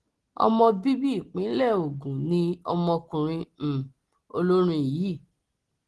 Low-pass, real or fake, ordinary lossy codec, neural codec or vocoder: 10.8 kHz; real; Opus, 16 kbps; none